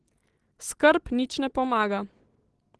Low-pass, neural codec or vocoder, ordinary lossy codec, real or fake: 10.8 kHz; none; Opus, 16 kbps; real